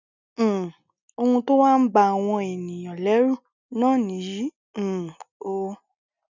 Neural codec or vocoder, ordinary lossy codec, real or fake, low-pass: none; none; real; 7.2 kHz